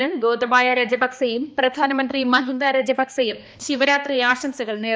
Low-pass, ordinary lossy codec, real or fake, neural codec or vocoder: none; none; fake; codec, 16 kHz, 2 kbps, X-Codec, HuBERT features, trained on balanced general audio